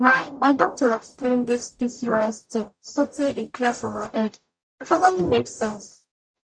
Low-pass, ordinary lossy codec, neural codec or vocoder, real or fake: 9.9 kHz; none; codec, 44.1 kHz, 0.9 kbps, DAC; fake